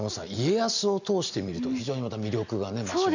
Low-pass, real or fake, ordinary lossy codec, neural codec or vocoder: 7.2 kHz; real; none; none